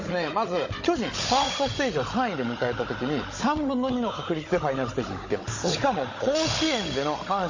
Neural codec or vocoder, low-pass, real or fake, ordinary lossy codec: codec, 16 kHz, 16 kbps, FunCodec, trained on Chinese and English, 50 frames a second; 7.2 kHz; fake; MP3, 32 kbps